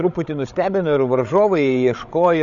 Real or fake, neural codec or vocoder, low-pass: fake; codec, 16 kHz, 16 kbps, FreqCodec, larger model; 7.2 kHz